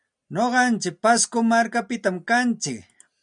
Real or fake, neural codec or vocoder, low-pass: real; none; 9.9 kHz